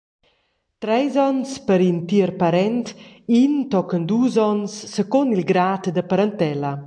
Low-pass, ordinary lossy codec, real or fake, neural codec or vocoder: 9.9 kHz; AAC, 64 kbps; real; none